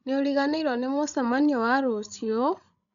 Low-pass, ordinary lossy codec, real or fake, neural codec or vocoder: 7.2 kHz; none; fake; codec, 16 kHz, 16 kbps, FreqCodec, larger model